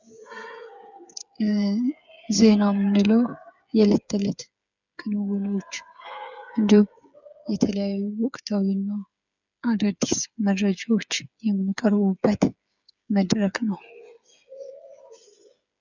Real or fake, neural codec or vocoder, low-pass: fake; codec, 16 kHz, 8 kbps, FreqCodec, smaller model; 7.2 kHz